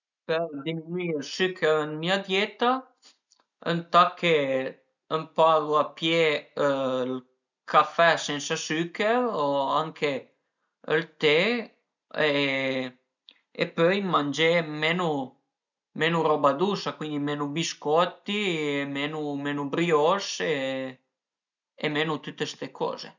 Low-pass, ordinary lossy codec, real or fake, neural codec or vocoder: 7.2 kHz; none; real; none